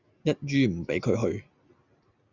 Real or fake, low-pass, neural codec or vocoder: real; 7.2 kHz; none